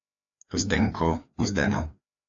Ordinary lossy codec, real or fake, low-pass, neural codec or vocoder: AAC, 48 kbps; fake; 7.2 kHz; codec, 16 kHz, 2 kbps, FreqCodec, larger model